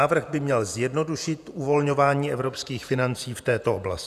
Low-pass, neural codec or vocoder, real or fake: 14.4 kHz; vocoder, 48 kHz, 128 mel bands, Vocos; fake